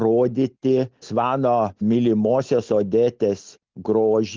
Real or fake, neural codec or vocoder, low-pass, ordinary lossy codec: real; none; 7.2 kHz; Opus, 32 kbps